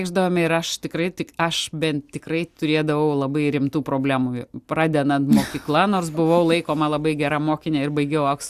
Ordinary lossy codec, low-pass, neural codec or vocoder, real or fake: Opus, 64 kbps; 14.4 kHz; none; real